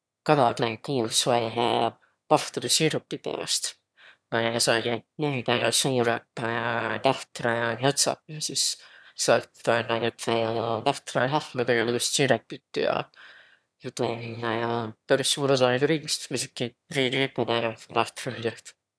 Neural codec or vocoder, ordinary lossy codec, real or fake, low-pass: autoencoder, 22.05 kHz, a latent of 192 numbers a frame, VITS, trained on one speaker; none; fake; none